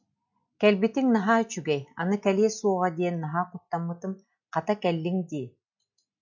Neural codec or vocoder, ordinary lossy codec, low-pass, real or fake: none; MP3, 64 kbps; 7.2 kHz; real